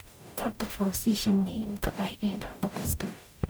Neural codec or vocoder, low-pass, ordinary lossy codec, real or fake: codec, 44.1 kHz, 0.9 kbps, DAC; none; none; fake